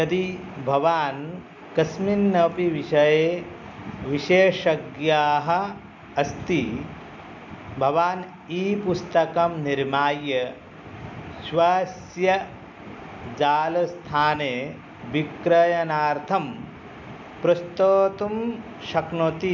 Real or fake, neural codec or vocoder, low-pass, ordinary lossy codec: real; none; 7.2 kHz; MP3, 64 kbps